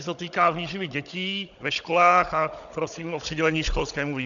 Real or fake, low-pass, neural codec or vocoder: fake; 7.2 kHz; codec, 16 kHz, 16 kbps, FunCodec, trained on Chinese and English, 50 frames a second